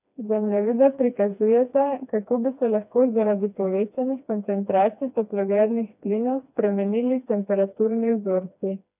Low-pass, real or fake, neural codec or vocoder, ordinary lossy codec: 3.6 kHz; fake; codec, 16 kHz, 2 kbps, FreqCodec, smaller model; none